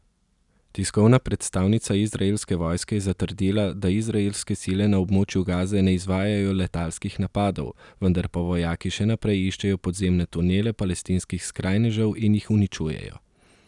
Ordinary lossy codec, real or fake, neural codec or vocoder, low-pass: none; real; none; 10.8 kHz